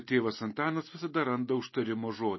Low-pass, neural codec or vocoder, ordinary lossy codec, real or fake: 7.2 kHz; none; MP3, 24 kbps; real